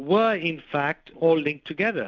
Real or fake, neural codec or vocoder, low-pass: real; none; 7.2 kHz